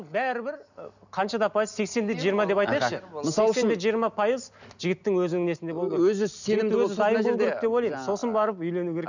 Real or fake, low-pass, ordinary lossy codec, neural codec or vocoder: real; 7.2 kHz; none; none